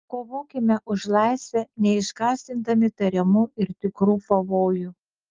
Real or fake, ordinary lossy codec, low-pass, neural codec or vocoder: real; Opus, 32 kbps; 7.2 kHz; none